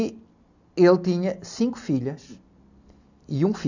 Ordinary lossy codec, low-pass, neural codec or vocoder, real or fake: none; 7.2 kHz; none; real